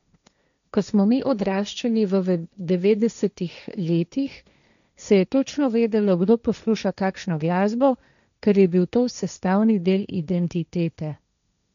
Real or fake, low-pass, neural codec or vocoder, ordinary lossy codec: fake; 7.2 kHz; codec, 16 kHz, 1.1 kbps, Voila-Tokenizer; none